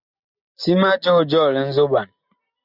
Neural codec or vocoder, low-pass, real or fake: none; 5.4 kHz; real